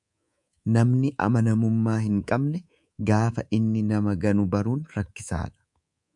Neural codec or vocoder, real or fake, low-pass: codec, 24 kHz, 3.1 kbps, DualCodec; fake; 10.8 kHz